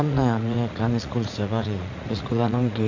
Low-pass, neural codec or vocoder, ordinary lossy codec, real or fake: 7.2 kHz; vocoder, 44.1 kHz, 80 mel bands, Vocos; MP3, 64 kbps; fake